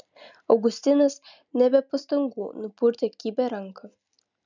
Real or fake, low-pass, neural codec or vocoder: real; 7.2 kHz; none